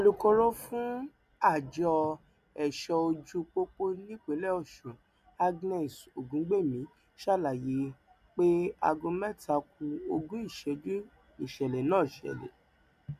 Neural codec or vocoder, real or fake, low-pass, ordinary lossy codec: none; real; 14.4 kHz; none